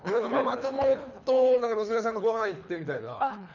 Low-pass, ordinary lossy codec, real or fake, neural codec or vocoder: 7.2 kHz; none; fake; codec, 24 kHz, 3 kbps, HILCodec